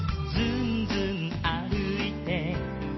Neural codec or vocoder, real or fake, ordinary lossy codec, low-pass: none; real; MP3, 24 kbps; 7.2 kHz